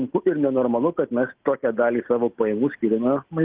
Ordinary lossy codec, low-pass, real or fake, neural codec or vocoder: Opus, 16 kbps; 3.6 kHz; real; none